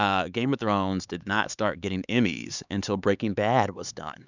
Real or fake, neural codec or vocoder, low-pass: fake; codec, 16 kHz, 4 kbps, X-Codec, HuBERT features, trained on LibriSpeech; 7.2 kHz